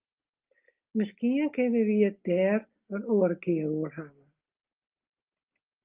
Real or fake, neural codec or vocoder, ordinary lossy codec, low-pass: real; none; Opus, 32 kbps; 3.6 kHz